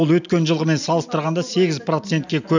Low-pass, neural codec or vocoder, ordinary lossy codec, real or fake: 7.2 kHz; none; none; real